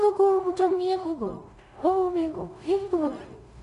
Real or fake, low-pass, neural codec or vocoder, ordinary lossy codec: fake; 10.8 kHz; codec, 16 kHz in and 24 kHz out, 0.4 kbps, LongCat-Audio-Codec, two codebook decoder; none